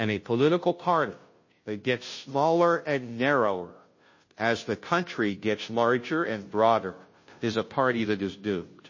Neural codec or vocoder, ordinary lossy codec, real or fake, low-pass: codec, 16 kHz, 0.5 kbps, FunCodec, trained on Chinese and English, 25 frames a second; MP3, 32 kbps; fake; 7.2 kHz